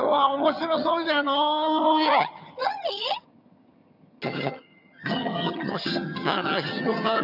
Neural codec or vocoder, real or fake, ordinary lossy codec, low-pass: vocoder, 22.05 kHz, 80 mel bands, HiFi-GAN; fake; none; 5.4 kHz